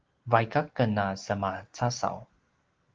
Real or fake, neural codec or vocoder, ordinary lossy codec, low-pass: real; none; Opus, 16 kbps; 7.2 kHz